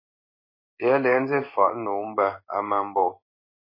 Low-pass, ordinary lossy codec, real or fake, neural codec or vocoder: 5.4 kHz; MP3, 24 kbps; fake; codec, 16 kHz in and 24 kHz out, 1 kbps, XY-Tokenizer